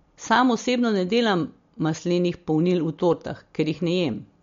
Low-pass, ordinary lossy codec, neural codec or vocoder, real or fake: 7.2 kHz; MP3, 48 kbps; none; real